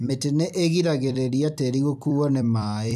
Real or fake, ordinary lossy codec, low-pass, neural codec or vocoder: fake; none; 14.4 kHz; vocoder, 44.1 kHz, 128 mel bands every 256 samples, BigVGAN v2